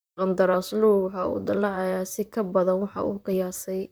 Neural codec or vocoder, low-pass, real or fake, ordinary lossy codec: vocoder, 44.1 kHz, 128 mel bands, Pupu-Vocoder; none; fake; none